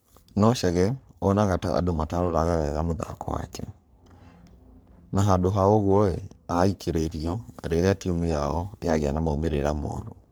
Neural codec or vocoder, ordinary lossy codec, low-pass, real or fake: codec, 44.1 kHz, 3.4 kbps, Pupu-Codec; none; none; fake